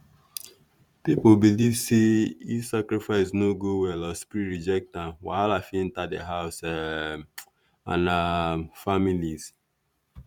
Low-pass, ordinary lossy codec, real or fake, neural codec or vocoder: 19.8 kHz; none; fake; vocoder, 44.1 kHz, 128 mel bands every 512 samples, BigVGAN v2